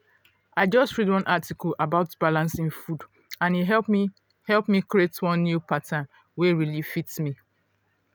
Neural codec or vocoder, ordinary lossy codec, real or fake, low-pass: none; none; real; none